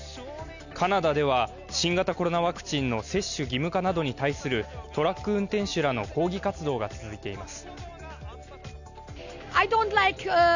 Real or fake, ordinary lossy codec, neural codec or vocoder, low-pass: real; none; none; 7.2 kHz